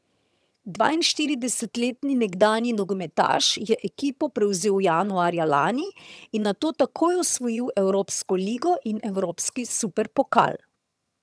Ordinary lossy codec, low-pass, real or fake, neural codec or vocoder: none; none; fake; vocoder, 22.05 kHz, 80 mel bands, HiFi-GAN